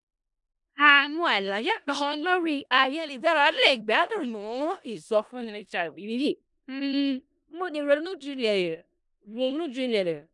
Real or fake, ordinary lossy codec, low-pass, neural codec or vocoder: fake; none; 10.8 kHz; codec, 16 kHz in and 24 kHz out, 0.4 kbps, LongCat-Audio-Codec, four codebook decoder